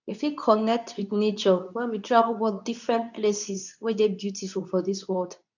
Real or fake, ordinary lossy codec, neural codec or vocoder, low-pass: fake; none; codec, 24 kHz, 0.9 kbps, WavTokenizer, medium speech release version 2; 7.2 kHz